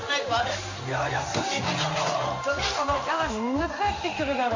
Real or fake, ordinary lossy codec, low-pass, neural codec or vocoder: fake; AAC, 48 kbps; 7.2 kHz; codec, 16 kHz in and 24 kHz out, 1 kbps, XY-Tokenizer